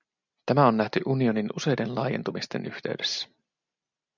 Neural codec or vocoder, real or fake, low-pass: none; real; 7.2 kHz